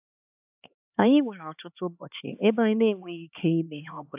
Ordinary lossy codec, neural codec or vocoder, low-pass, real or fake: AAC, 32 kbps; codec, 16 kHz, 4 kbps, X-Codec, HuBERT features, trained on LibriSpeech; 3.6 kHz; fake